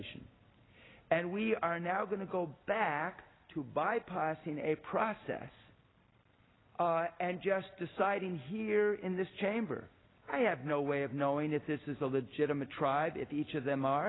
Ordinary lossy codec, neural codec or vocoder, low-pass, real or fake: AAC, 16 kbps; none; 7.2 kHz; real